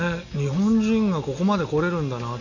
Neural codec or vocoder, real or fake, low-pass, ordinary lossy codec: none; real; 7.2 kHz; Opus, 64 kbps